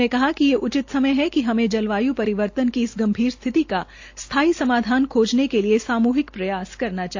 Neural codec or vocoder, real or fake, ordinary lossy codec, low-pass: none; real; AAC, 48 kbps; 7.2 kHz